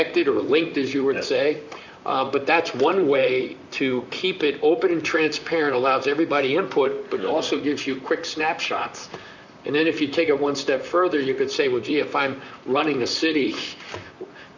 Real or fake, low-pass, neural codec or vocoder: fake; 7.2 kHz; vocoder, 44.1 kHz, 128 mel bands, Pupu-Vocoder